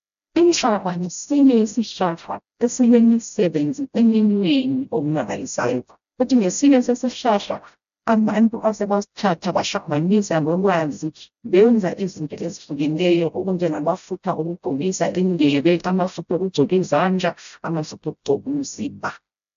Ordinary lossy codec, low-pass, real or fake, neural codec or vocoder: AAC, 96 kbps; 7.2 kHz; fake; codec, 16 kHz, 0.5 kbps, FreqCodec, smaller model